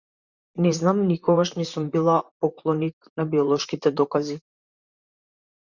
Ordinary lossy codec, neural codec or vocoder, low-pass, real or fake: Opus, 64 kbps; vocoder, 44.1 kHz, 128 mel bands, Pupu-Vocoder; 7.2 kHz; fake